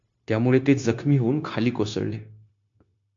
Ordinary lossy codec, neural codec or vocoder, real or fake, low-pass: MP3, 48 kbps; codec, 16 kHz, 0.9 kbps, LongCat-Audio-Codec; fake; 7.2 kHz